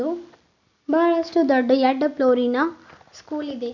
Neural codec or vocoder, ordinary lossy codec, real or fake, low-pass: none; none; real; 7.2 kHz